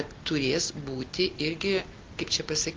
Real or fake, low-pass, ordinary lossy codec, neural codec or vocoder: real; 7.2 kHz; Opus, 32 kbps; none